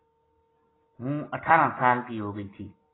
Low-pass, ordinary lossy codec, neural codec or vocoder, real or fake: 7.2 kHz; AAC, 16 kbps; none; real